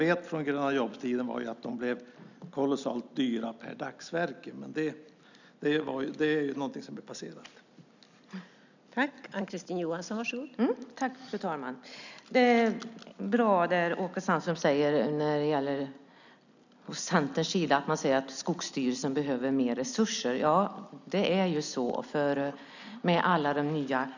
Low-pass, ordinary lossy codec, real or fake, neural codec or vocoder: 7.2 kHz; none; real; none